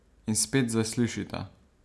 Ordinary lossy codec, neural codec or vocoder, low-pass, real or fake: none; none; none; real